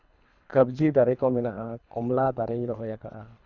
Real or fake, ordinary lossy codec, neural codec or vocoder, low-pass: fake; none; codec, 24 kHz, 3 kbps, HILCodec; 7.2 kHz